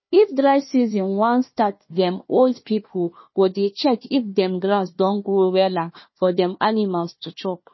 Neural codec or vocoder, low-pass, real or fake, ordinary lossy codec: codec, 16 kHz, 1 kbps, FunCodec, trained on Chinese and English, 50 frames a second; 7.2 kHz; fake; MP3, 24 kbps